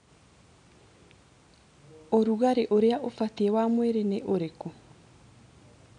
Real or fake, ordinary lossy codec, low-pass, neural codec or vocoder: real; none; 9.9 kHz; none